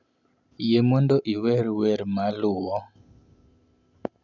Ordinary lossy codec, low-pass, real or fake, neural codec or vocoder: none; 7.2 kHz; real; none